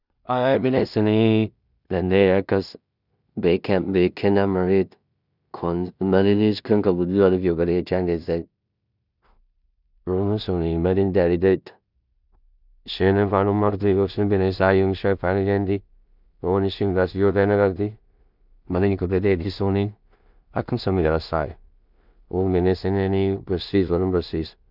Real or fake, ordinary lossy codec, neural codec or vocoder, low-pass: fake; none; codec, 16 kHz in and 24 kHz out, 0.4 kbps, LongCat-Audio-Codec, two codebook decoder; 5.4 kHz